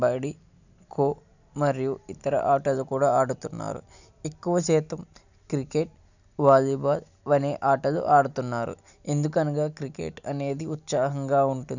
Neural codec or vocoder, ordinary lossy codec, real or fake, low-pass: none; none; real; 7.2 kHz